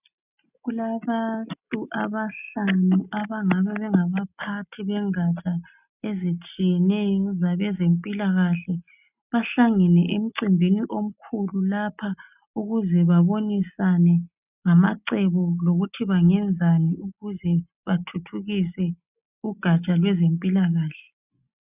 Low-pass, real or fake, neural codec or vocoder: 3.6 kHz; real; none